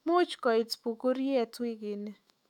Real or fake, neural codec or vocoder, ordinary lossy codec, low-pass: fake; autoencoder, 48 kHz, 128 numbers a frame, DAC-VAE, trained on Japanese speech; none; 19.8 kHz